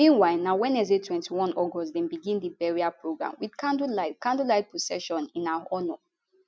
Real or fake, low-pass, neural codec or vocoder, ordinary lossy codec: real; none; none; none